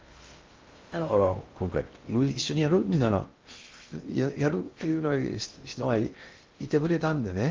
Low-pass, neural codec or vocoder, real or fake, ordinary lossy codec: 7.2 kHz; codec, 16 kHz in and 24 kHz out, 0.6 kbps, FocalCodec, streaming, 2048 codes; fake; Opus, 32 kbps